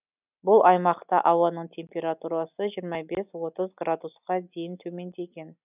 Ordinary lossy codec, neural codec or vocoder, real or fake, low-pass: none; none; real; 3.6 kHz